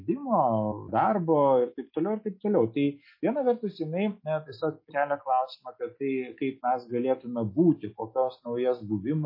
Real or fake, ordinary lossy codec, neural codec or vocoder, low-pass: real; MP3, 24 kbps; none; 5.4 kHz